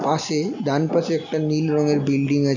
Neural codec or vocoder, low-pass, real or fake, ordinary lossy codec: none; 7.2 kHz; real; none